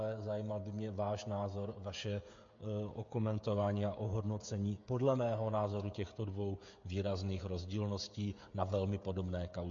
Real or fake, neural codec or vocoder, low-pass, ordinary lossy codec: fake; codec, 16 kHz, 16 kbps, FreqCodec, smaller model; 7.2 kHz; MP3, 48 kbps